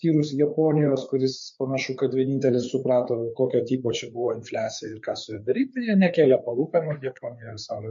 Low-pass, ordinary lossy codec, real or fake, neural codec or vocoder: 7.2 kHz; MP3, 48 kbps; fake; codec, 16 kHz, 4 kbps, FreqCodec, larger model